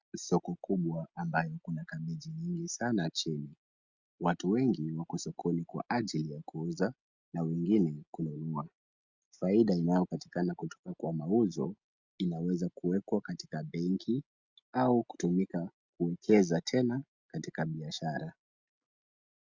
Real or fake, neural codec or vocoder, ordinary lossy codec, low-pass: real; none; Opus, 64 kbps; 7.2 kHz